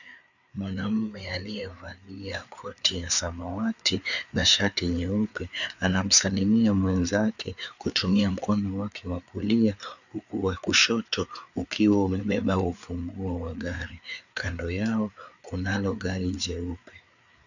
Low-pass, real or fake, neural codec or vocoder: 7.2 kHz; fake; codec, 16 kHz, 4 kbps, FreqCodec, larger model